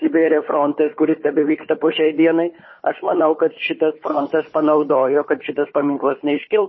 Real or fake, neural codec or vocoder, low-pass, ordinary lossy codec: fake; codec, 16 kHz, 4 kbps, FunCodec, trained on Chinese and English, 50 frames a second; 7.2 kHz; MP3, 24 kbps